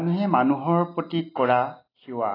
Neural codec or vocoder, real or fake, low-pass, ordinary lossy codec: none; real; 5.4 kHz; AAC, 24 kbps